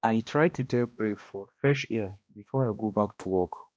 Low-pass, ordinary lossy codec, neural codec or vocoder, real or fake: none; none; codec, 16 kHz, 1 kbps, X-Codec, HuBERT features, trained on balanced general audio; fake